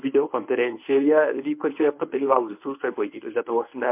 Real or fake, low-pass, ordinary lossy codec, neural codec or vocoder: fake; 3.6 kHz; MP3, 32 kbps; codec, 24 kHz, 0.9 kbps, WavTokenizer, medium speech release version 1